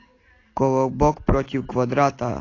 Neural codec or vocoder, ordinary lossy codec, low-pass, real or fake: none; AAC, 32 kbps; 7.2 kHz; real